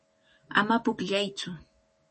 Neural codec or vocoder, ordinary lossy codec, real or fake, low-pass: autoencoder, 48 kHz, 128 numbers a frame, DAC-VAE, trained on Japanese speech; MP3, 32 kbps; fake; 10.8 kHz